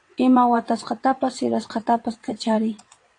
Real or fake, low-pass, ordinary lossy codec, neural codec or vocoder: fake; 9.9 kHz; AAC, 48 kbps; vocoder, 22.05 kHz, 80 mel bands, WaveNeXt